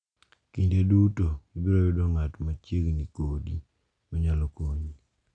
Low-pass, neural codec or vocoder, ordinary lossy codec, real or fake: 9.9 kHz; none; none; real